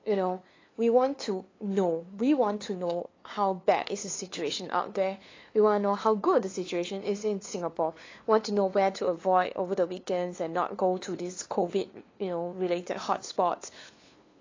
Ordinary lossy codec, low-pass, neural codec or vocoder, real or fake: AAC, 32 kbps; 7.2 kHz; codec, 16 kHz, 2 kbps, FunCodec, trained on LibriTTS, 25 frames a second; fake